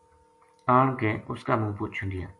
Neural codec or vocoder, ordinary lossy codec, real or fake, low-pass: none; AAC, 64 kbps; real; 10.8 kHz